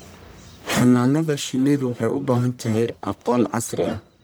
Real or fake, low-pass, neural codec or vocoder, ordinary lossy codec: fake; none; codec, 44.1 kHz, 1.7 kbps, Pupu-Codec; none